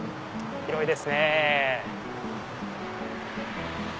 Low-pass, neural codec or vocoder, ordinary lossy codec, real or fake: none; none; none; real